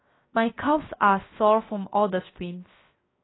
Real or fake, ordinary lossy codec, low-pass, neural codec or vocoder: fake; AAC, 16 kbps; 7.2 kHz; codec, 16 kHz, 0.7 kbps, FocalCodec